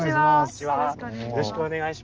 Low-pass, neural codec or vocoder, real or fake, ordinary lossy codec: 7.2 kHz; none; real; Opus, 24 kbps